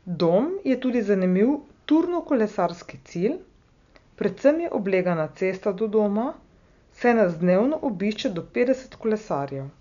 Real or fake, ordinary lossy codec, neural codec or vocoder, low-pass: real; none; none; 7.2 kHz